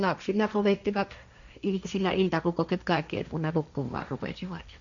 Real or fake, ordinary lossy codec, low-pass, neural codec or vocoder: fake; none; 7.2 kHz; codec, 16 kHz, 1.1 kbps, Voila-Tokenizer